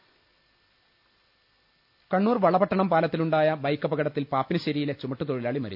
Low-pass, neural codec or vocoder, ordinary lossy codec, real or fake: 5.4 kHz; none; none; real